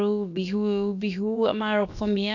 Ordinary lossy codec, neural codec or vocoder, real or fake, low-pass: none; codec, 16 kHz, about 1 kbps, DyCAST, with the encoder's durations; fake; 7.2 kHz